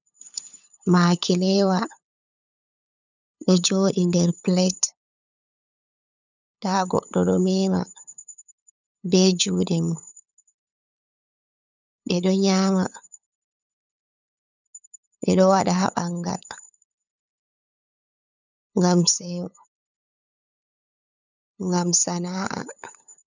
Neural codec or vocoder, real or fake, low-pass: codec, 16 kHz, 8 kbps, FunCodec, trained on LibriTTS, 25 frames a second; fake; 7.2 kHz